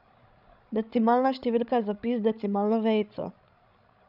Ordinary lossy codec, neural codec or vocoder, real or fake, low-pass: none; codec, 16 kHz, 16 kbps, FreqCodec, larger model; fake; 5.4 kHz